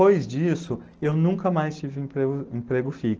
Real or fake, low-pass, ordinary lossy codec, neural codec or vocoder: real; 7.2 kHz; Opus, 16 kbps; none